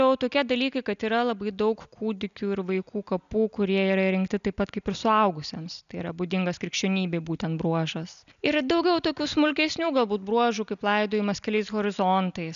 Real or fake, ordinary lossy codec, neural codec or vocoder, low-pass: real; MP3, 96 kbps; none; 7.2 kHz